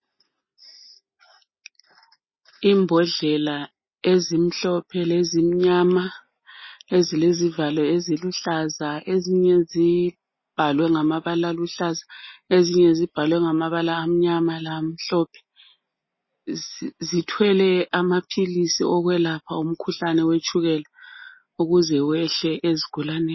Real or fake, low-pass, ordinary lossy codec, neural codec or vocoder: real; 7.2 kHz; MP3, 24 kbps; none